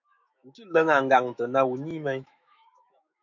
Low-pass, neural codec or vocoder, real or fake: 7.2 kHz; autoencoder, 48 kHz, 128 numbers a frame, DAC-VAE, trained on Japanese speech; fake